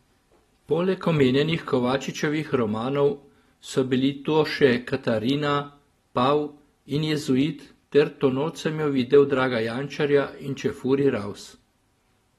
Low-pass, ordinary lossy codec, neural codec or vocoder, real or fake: 19.8 kHz; AAC, 32 kbps; none; real